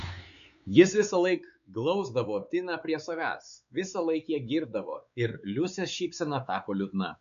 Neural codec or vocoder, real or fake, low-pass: codec, 16 kHz, 4 kbps, X-Codec, WavLM features, trained on Multilingual LibriSpeech; fake; 7.2 kHz